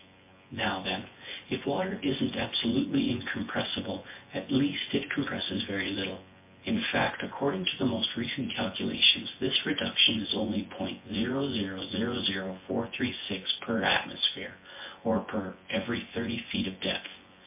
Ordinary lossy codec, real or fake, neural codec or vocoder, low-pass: MP3, 24 kbps; fake; vocoder, 24 kHz, 100 mel bands, Vocos; 3.6 kHz